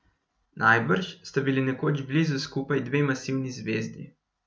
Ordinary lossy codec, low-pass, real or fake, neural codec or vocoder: none; none; real; none